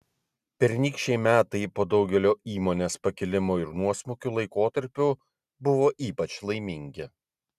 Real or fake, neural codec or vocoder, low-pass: real; none; 14.4 kHz